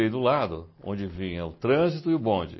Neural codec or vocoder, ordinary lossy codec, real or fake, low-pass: none; MP3, 24 kbps; real; 7.2 kHz